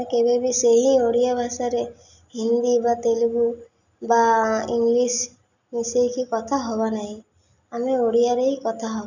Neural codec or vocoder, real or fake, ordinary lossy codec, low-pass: none; real; none; 7.2 kHz